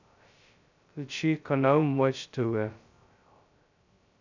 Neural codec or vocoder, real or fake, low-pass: codec, 16 kHz, 0.2 kbps, FocalCodec; fake; 7.2 kHz